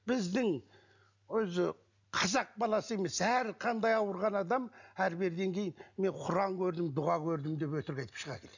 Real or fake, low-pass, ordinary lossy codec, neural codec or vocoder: real; 7.2 kHz; none; none